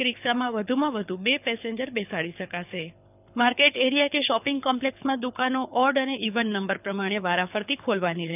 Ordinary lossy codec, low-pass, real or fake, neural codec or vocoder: none; 3.6 kHz; fake; codec, 24 kHz, 6 kbps, HILCodec